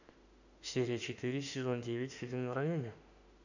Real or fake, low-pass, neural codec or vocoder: fake; 7.2 kHz; autoencoder, 48 kHz, 32 numbers a frame, DAC-VAE, trained on Japanese speech